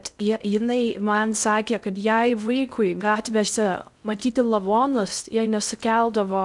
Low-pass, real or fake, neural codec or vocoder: 10.8 kHz; fake; codec, 16 kHz in and 24 kHz out, 0.6 kbps, FocalCodec, streaming, 2048 codes